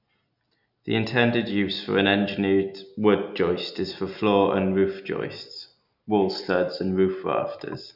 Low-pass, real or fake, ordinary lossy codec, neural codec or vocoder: 5.4 kHz; real; none; none